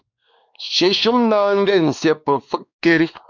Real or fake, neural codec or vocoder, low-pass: fake; codec, 16 kHz, 2 kbps, X-Codec, WavLM features, trained on Multilingual LibriSpeech; 7.2 kHz